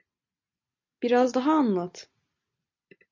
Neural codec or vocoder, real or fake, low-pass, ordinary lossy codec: none; real; 7.2 kHz; MP3, 48 kbps